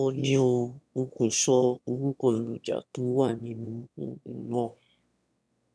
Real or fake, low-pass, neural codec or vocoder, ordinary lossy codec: fake; none; autoencoder, 22.05 kHz, a latent of 192 numbers a frame, VITS, trained on one speaker; none